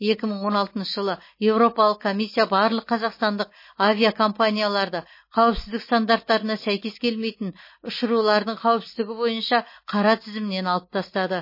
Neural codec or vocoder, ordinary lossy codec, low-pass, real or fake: none; MP3, 24 kbps; 5.4 kHz; real